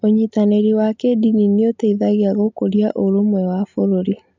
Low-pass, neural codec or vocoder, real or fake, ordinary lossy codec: 7.2 kHz; none; real; MP3, 64 kbps